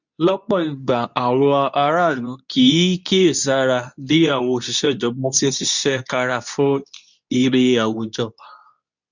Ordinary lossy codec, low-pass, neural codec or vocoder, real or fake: none; 7.2 kHz; codec, 24 kHz, 0.9 kbps, WavTokenizer, medium speech release version 2; fake